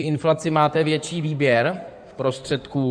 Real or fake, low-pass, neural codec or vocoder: fake; 9.9 kHz; codec, 16 kHz in and 24 kHz out, 2.2 kbps, FireRedTTS-2 codec